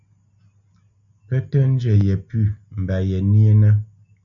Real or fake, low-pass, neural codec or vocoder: real; 7.2 kHz; none